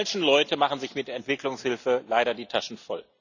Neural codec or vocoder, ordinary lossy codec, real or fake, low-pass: none; none; real; 7.2 kHz